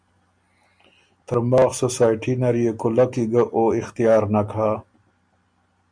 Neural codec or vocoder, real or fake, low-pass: none; real; 9.9 kHz